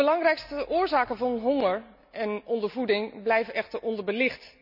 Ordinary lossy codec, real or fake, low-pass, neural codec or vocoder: none; real; 5.4 kHz; none